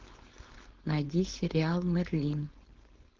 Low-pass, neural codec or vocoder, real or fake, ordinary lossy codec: 7.2 kHz; codec, 16 kHz, 4.8 kbps, FACodec; fake; Opus, 16 kbps